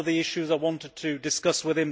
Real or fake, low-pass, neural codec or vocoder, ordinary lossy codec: real; none; none; none